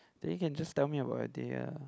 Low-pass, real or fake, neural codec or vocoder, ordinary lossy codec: none; real; none; none